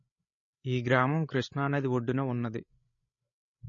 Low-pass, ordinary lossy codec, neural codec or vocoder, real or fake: 7.2 kHz; MP3, 32 kbps; codec, 16 kHz, 16 kbps, FreqCodec, larger model; fake